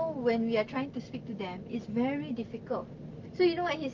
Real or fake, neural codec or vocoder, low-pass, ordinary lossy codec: real; none; 7.2 kHz; Opus, 24 kbps